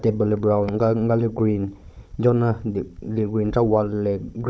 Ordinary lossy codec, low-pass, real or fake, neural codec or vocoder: none; none; fake; codec, 16 kHz, 16 kbps, FunCodec, trained on Chinese and English, 50 frames a second